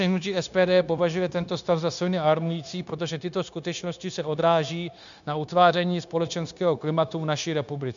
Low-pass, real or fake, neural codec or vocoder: 7.2 kHz; fake; codec, 16 kHz, 0.9 kbps, LongCat-Audio-Codec